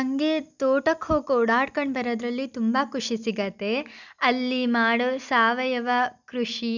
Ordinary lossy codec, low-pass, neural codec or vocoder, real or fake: none; 7.2 kHz; none; real